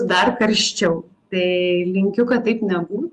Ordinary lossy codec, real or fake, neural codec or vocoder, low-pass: Opus, 32 kbps; real; none; 10.8 kHz